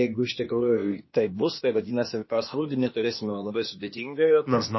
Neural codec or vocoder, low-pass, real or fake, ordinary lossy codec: codec, 16 kHz, 0.8 kbps, ZipCodec; 7.2 kHz; fake; MP3, 24 kbps